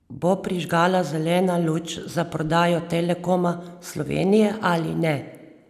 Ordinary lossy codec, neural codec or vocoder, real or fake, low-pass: none; none; real; 14.4 kHz